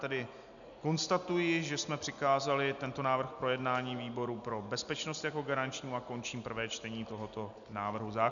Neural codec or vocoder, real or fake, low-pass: none; real; 7.2 kHz